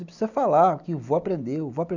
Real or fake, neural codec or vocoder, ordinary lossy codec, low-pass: real; none; none; 7.2 kHz